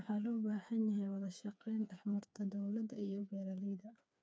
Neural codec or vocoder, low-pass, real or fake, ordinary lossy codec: codec, 16 kHz, 4 kbps, FreqCodec, smaller model; none; fake; none